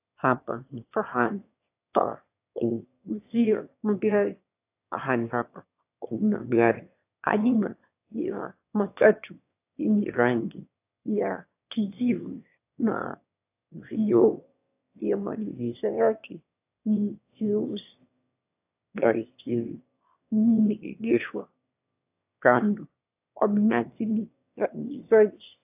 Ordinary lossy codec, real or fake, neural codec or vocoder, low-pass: AAC, 32 kbps; fake; autoencoder, 22.05 kHz, a latent of 192 numbers a frame, VITS, trained on one speaker; 3.6 kHz